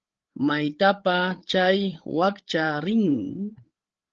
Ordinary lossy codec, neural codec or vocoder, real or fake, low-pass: Opus, 16 kbps; codec, 16 kHz, 8 kbps, FreqCodec, larger model; fake; 7.2 kHz